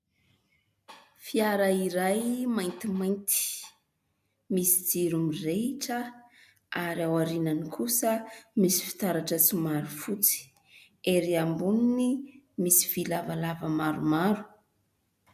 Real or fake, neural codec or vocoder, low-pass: real; none; 14.4 kHz